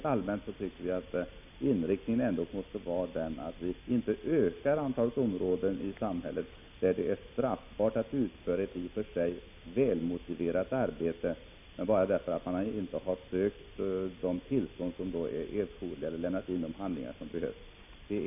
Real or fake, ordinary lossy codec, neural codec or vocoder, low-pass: real; none; none; 3.6 kHz